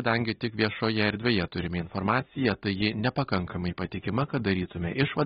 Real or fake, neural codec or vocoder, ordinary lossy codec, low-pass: real; none; AAC, 16 kbps; 14.4 kHz